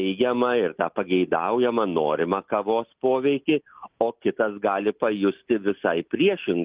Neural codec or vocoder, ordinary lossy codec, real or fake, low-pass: none; Opus, 32 kbps; real; 3.6 kHz